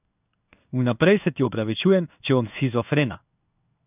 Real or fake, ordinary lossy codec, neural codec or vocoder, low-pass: fake; none; codec, 16 kHz in and 24 kHz out, 1 kbps, XY-Tokenizer; 3.6 kHz